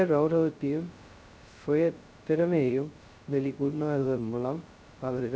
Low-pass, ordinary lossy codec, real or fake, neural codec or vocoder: none; none; fake; codec, 16 kHz, 0.2 kbps, FocalCodec